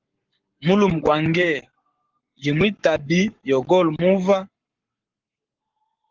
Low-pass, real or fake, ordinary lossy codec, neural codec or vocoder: 7.2 kHz; fake; Opus, 16 kbps; codec, 44.1 kHz, 7.8 kbps, Pupu-Codec